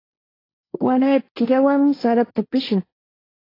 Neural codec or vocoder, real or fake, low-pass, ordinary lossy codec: codec, 16 kHz, 1.1 kbps, Voila-Tokenizer; fake; 5.4 kHz; AAC, 24 kbps